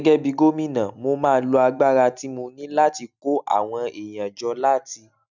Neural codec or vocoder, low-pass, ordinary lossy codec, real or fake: none; 7.2 kHz; none; real